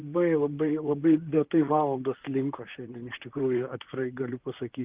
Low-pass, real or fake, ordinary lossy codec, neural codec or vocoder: 3.6 kHz; fake; Opus, 32 kbps; vocoder, 44.1 kHz, 128 mel bands, Pupu-Vocoder